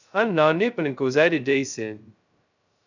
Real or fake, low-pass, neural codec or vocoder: fake; 7.2 kHz; codec, 16 kHz, 0.2 kbps, FocalCodec